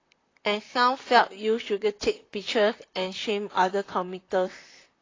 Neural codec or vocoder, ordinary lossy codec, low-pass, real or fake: vocoder, 44.1 kHz, 128 mel bands, Pupu-Vocoder; AAC, 32 kbps; 7.2 kHz; fake